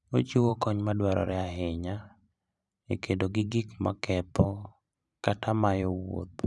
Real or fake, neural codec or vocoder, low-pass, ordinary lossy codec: fake; vocoder, 44.1 kHz, 128 mel bands every 512 samples, BigVGAN v2; 10.8 kHz; none